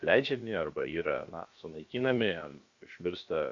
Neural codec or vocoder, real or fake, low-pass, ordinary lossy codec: codec, 16 kHz, about 1 kbps, DyCAST, with the encoder's durations; fake; 7.2 kHz; Opus, 64 kbps